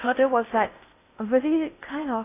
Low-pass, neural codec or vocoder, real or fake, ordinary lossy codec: 3.6 kHz; codec, 16 kHz in and 24 kHz out, 0.6 kbps, FocalCodec, streaming, 2048 codes; fake; AAC, 24 kbps